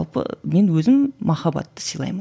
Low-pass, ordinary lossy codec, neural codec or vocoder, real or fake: none; none; none; real